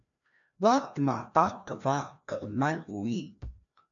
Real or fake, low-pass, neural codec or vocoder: fake; 7.2 kHz; codec, 16 kHz, 1 kbps, FreqCodec, larger model